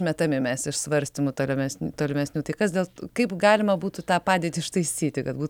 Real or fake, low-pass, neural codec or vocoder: real; 19.8 kHz; none